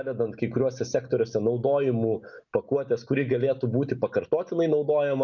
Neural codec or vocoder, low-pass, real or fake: none; 7.2 kHz; real